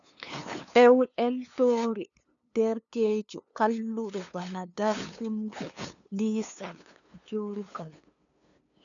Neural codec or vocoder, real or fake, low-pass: codec, 16 kHz, 2 kbps, FunCodec, trained on LibriTTS, 25 frames a second; fake; 7.2 kHz